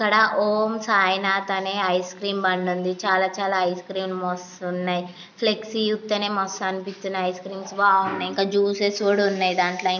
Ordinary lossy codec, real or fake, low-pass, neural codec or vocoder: none; real; 7.2 kHz; none